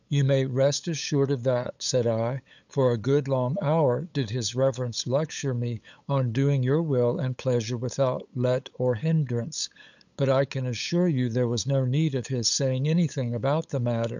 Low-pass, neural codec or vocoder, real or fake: 7.2 kHz; codec, 16 kHz, 8 kbps, FunCodec, trained on LibriTTS, 25 frames a second; fake